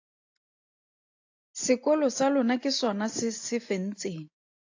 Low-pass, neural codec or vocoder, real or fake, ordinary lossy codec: 7.2 kHz; none; real; AAC, 48 kbps